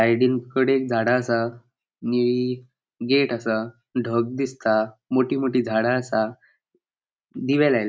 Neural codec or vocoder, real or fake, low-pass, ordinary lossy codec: none; real; none; none